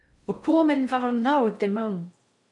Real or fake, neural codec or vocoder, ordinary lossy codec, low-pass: fake; codec, 16 kHz in and 24 kHz out, 0.6 kbps, FocalCodec, streaming, 4096 codes; MP3, 64 kbps; 10.8 kHz